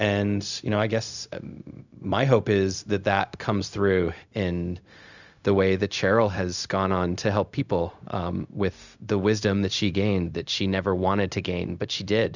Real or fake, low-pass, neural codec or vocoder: fake; 7.2 kHz; codec, 16 kHz, 0.4 kbps, LongCat-Audio-Codec